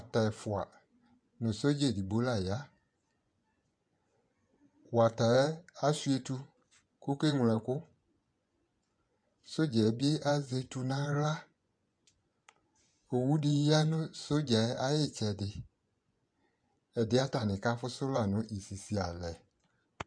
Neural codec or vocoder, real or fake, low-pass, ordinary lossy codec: vocoder, 24 kHz, 100 mel bands, Vocos; fake; 9.9 kHz; MP3, 64 kbps